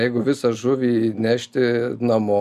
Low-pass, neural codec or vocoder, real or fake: 14.4 kHz; none; real